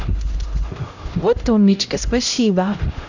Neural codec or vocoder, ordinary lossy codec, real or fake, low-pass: codec, 16 kHz, 0.5 kbps, X-Codec, HuBERT features, trained on LibriSpeech; none; fake; 7.2 kHz